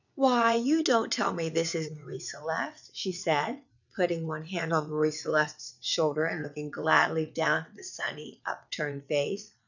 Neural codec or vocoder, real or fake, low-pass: vocoder, 22.05 kHz, 80 mel bands, WaveNeXt; fake; 7.2 kHz